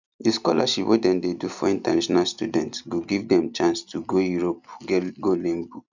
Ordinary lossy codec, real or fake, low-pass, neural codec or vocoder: none; real; 7.2 kHz; none